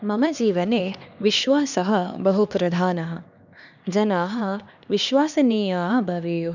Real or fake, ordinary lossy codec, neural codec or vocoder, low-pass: fake; none; codec, 16 kHz, 2 kbps, X-Codec, HuBERT features, trained on LibriSpeech; 7.2 kHz